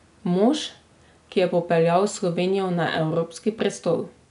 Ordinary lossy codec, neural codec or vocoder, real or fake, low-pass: MP3, 96 kbps; none; real; 10.8 kHz